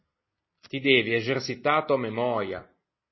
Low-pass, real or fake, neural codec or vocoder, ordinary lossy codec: 7.2 kHz; real; none; MP3, 24 kbps